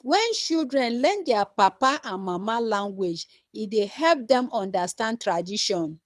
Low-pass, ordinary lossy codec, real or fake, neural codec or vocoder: none; none; fake; codec, 24 kHz, 6 kbps, HILCodec